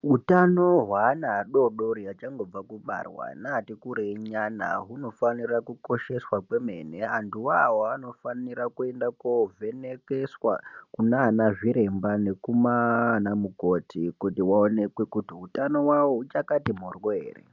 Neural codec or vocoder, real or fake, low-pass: none; real; 7.2 kHz